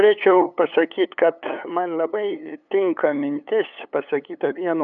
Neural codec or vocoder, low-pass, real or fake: codec, 16 kHz, 8 kbps, FunCodec, trained on LibriTTS, 25 frames a second; 7.2 kHz; fake